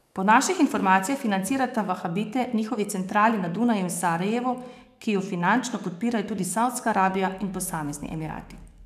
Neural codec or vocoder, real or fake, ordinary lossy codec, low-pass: codec, 44.1 kHz, 7.8 kbps, DAC; fake; none; 14.4 kHz